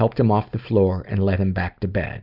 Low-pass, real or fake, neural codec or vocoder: 5.4 kHz; real; none